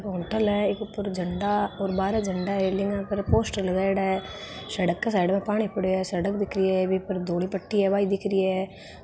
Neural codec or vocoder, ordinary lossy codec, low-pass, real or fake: none; none; none; real